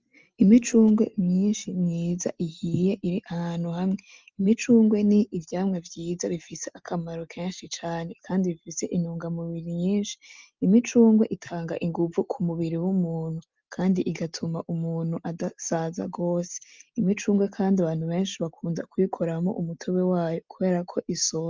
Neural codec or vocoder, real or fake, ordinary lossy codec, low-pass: none; real; Opus, 24 kbps; 7.2 kHz